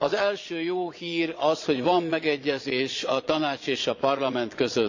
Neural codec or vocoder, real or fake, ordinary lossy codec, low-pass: vocoder, 22.05 kHz, 80 mel bands, Vocos; fake; AAC, 48 kbps; 7.2 kHz